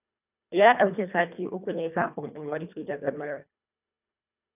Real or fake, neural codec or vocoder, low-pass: fake; codec, 24 kHz, 1.5 kbps, HILCodec; 3.6 kHz